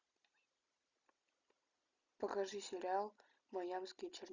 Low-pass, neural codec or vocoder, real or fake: 7.2 kHz; none; real